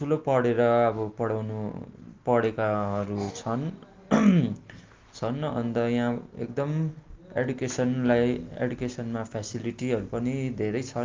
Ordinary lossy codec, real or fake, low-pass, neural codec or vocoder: Opus, 24 kbps; real; 7.2 kHz; none